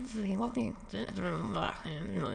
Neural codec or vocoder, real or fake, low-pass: autoencoder, 22.05 kHz, a latent of 192 numbers a frame, VITS, trained on many speakers; fake; 9.9 kHz